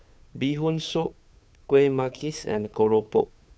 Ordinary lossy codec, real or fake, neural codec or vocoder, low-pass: none; fake; codec, 16 kHz, 2 kbps, FunCodec, trained on Chinese and English, 25 frames a second; none